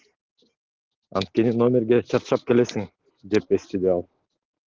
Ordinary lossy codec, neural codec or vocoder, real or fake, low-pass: Opus, 16 kbps; none; real; 7.2 kHz